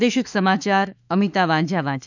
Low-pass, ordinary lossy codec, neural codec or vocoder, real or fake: 7.2 kHz; none; autoencoder, 48 kHz, 32 numbers a frame, DAC-VAE, trained on Japanese speech; fake